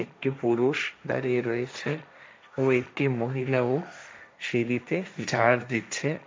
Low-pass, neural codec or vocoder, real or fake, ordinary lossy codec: none; codec, 16 kHz, 1.1 kbps, Voila-Tokenizer; fake; none